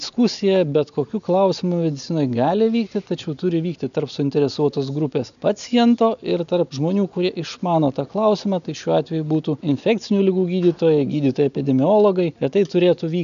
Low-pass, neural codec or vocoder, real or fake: 7.2 kHz; none; real